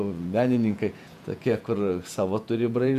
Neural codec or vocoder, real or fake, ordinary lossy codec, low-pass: vocoder, 48 kHz, 128 mel bands, Vocos; fake; MP3, 96 kbps; 14.4 kHz